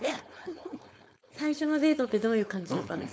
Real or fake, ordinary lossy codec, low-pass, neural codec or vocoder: fake; none; none; codec, 16 kHz, 4.8 kbps, FACodec